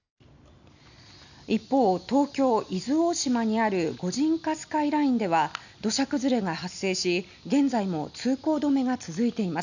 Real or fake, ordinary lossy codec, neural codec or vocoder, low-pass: real; none; none; 7.2 kHz